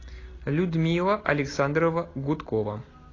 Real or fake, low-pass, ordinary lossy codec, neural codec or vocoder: real; 7.2 kHz; AAC, 32 kbps; none